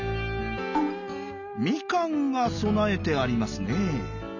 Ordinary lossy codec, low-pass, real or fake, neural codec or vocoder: none; 7.2 kHz; real; none